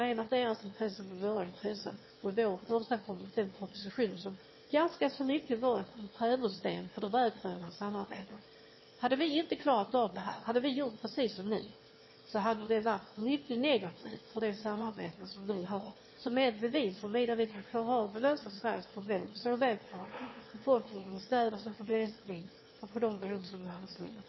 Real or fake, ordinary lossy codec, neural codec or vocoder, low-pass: fake; MP3, 24 kbps; autoencoder, 22.05 kHz, a latent of 192 numbers a frame, VITS, trained on one speaker; 7.2 kHz